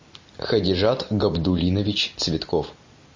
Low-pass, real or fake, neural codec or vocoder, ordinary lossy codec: 7.2 kHz; real; none; MP3, 32 kbps